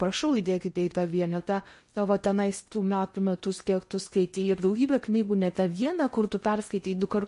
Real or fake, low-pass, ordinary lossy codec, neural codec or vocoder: fake; 10.8 kHz; MP3, 48 kbps; codec, 16 kHz in and 24 kHz out, 0.8 kbps, FocalCodec, streaming, 65536 codes